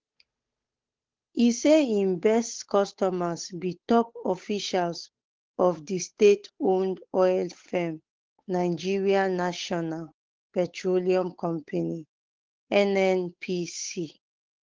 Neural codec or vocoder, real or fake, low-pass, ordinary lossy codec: codec, 16 kHz, 8 kbps, FunCodec, trained on Chinese and English, 25 frames a second; fake; 7.2 kHz; Opus, 16 kbps